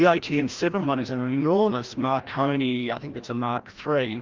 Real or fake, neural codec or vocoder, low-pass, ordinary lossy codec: fake; codec, 16 kHz, 1 kbps, FreqCodec, larger model; 7.2 kHz; Opus, 32 kbps